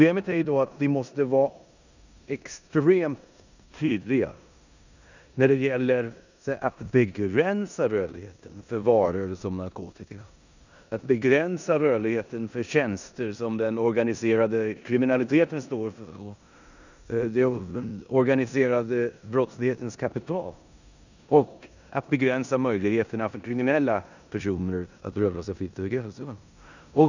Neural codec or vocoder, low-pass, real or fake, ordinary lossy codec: codec, 16 kHz in and 24 kHz out, 0.9 kbps, LongCat-Audio-Codec, four codebook decoder; 7.2 kHz; fake; none